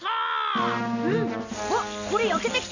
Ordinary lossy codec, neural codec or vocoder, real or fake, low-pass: none; none; real; 7.2 kHz